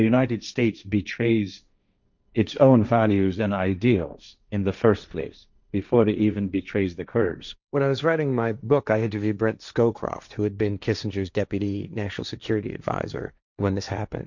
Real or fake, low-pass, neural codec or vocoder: fake; 7.2 kHz; codec, 16 kHz, 1.1 kbps, Voila-Tokenizer